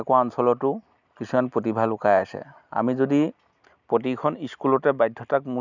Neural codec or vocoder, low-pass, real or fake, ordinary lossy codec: none; 7.2 kHz; real; none